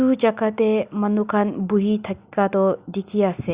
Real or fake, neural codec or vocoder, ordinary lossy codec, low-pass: real; none; Opus, 64 kbps; 3.6 kHz